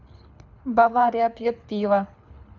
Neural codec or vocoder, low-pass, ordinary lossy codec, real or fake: codec, 24 kHz, 6 kbps, HILCodec; 7.2 kHz; none; fake